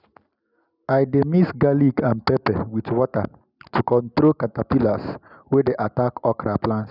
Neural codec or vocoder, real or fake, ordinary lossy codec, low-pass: none; real; none; 5.4 kHz